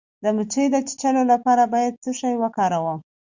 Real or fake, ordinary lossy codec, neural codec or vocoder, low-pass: real; Opus, 64 kbps; none; 7.2 kHz